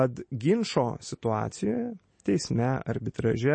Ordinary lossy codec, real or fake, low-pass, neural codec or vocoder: MP3, 32 kbps; real; 9.9 kHz; none